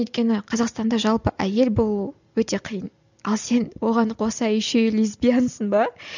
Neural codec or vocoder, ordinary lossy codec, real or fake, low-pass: none; none; real; 7.2 kHz